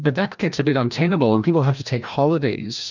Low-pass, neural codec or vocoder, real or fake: 7.2 kHz; codec, 16 kHz, 1 kbps, FreqCodec, larger model; fake